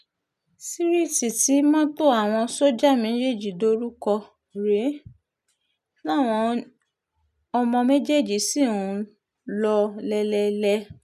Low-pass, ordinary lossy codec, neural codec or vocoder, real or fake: 14.4 kHz; none; none; real